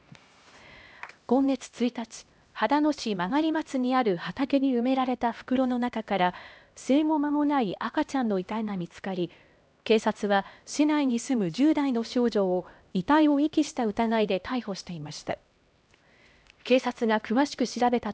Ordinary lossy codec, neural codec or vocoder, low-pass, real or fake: none; codec, 16 kHz, 1 kbps, X-Codec, HuBERT features, trained on LibriSpeech; none; fake